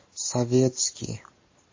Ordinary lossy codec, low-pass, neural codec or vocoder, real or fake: MP3, 32 kbps; 7.2 kHz; none; real